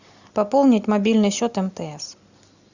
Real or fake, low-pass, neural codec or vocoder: real; 7.2 kHz; none